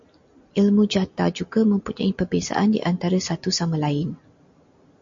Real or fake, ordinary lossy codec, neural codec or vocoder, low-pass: real; MP3, 48 kbps; none; 7.2 kHz